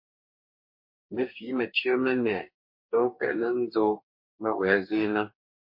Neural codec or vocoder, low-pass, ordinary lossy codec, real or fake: codec, 44.1 kHz, 2.6 kbps, DAC; 5.4 kHz; MP3, 32 kbps; fake